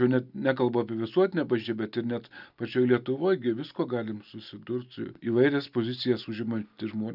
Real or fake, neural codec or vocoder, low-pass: real; none; 5.4 kHz